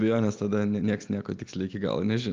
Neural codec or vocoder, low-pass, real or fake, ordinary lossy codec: none; 7.2 kHz; real; Opus, 24 kbps